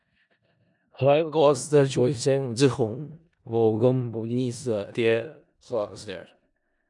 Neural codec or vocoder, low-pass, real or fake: codec, 16 kHz in and 24 kHz out, 0.4 kbps, LongCat-Audio-Codec, four codebook decoder; 10.8 kHz; fake